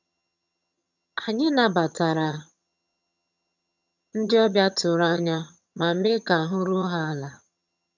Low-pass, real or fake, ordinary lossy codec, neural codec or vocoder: 7.2 kHz; fake; none; vocoder, 22.05 kHz, 80 mel bands, HiFi-GAN